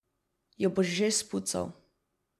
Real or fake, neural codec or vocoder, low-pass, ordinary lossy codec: real; none; 14.4 kHz; MP3, 96 kbps